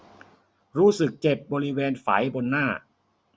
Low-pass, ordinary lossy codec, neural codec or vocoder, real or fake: none; none; none; real